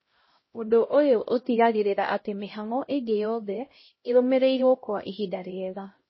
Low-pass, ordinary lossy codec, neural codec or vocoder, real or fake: 7.2 kHz; MP3, 24 kbps; codec, 16 kHz, 0.5 kbps, X-Codec, HuBERT features, trained on LibriSpeech; fake